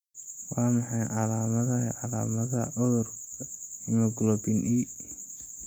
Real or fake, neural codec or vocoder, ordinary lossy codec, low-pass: real; none; none; 19.8 kHz